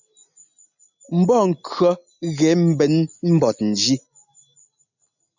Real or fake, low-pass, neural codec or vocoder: real; 7.2 kHz; none